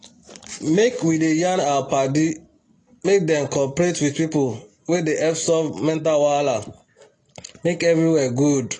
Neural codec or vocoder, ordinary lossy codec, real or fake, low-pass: none; AAC, 32 kbps; real; 10.8 kHz